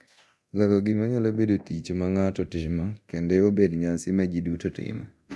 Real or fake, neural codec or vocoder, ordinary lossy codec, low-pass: fake; codec, 24 kHz, 0.9 kbps, DualCodec; none; none